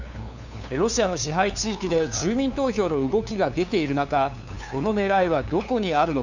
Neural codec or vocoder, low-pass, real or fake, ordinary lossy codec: codec, 16 kHz, 2 kbps, FunCodec, trained on LibriTTS, 25 frames a second; 7.2 kHz; fake; none